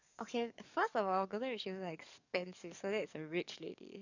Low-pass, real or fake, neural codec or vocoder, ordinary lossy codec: 7.2 kHz; fake; codec, 44.1 kHz, 7.8 kbps, DAC; none